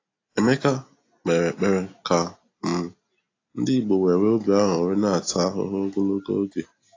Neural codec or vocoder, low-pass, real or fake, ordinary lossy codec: none; 7.2 kHz; real; AAC, 32 kbps